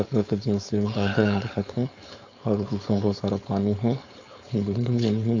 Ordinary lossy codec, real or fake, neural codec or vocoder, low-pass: AAC, 48 kbps; fake; codec, 16 kHz, 4.8 kbps, FACodec; 7.2 kHz